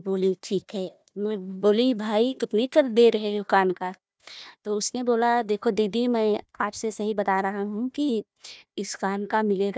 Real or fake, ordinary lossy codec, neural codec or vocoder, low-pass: fake; none; codec, 16 kHz, 1 kbps, FunCodec, trained on Chinese and English, 50 frames a second; none